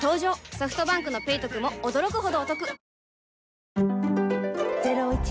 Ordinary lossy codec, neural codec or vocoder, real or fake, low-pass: none; none; real; none